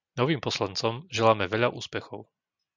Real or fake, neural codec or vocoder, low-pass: real; none; 7.2 kHz